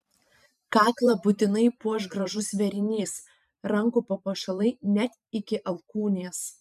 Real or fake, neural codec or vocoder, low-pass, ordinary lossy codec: fake; vocoder, 44.1 kHz, 128 mel bands every 512 samples, BigVGAN v2; 14.4 kHz; MP3, 96 kbps